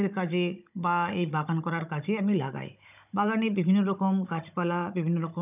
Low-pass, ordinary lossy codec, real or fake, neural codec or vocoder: 3.6 kHz; none; fake; codec, 16 kHz, 16 kbps, FunCodec, trained on Chinese and English, 50 frames a second